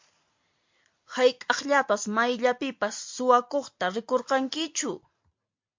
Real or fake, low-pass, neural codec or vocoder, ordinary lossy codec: real; 7.2 kHz; none; MP3, 48 kbps